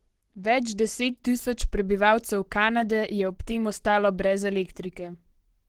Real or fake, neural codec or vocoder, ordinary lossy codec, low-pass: fake; codec, 44.1 kHz, 7.8 kbps, Pupu-Codec; Opus, 16 kbps; 19.8 kHz